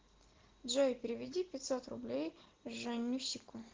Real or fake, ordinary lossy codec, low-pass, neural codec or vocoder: real; Opus, 16 kbps; 7.2 kHz; none